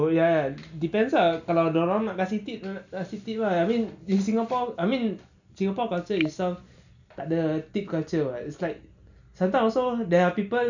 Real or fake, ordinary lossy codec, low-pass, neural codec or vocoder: real; none; 7.2 kHz; none